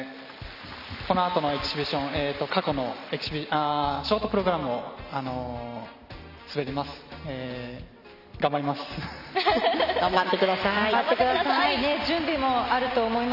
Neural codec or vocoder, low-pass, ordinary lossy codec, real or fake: none; 5.4 kHz; none; real